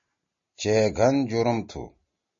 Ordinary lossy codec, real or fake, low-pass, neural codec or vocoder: MP3, 32 kbps; real; 7.2 kHz; none